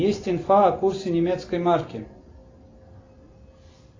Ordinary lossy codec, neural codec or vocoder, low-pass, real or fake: AAC, 32 kbps; none; 7.2 kHz; real